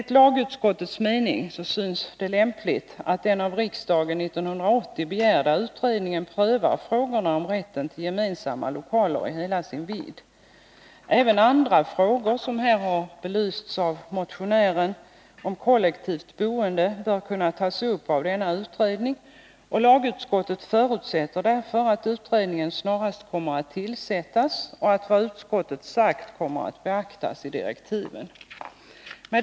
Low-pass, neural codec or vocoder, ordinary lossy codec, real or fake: none; none; none; real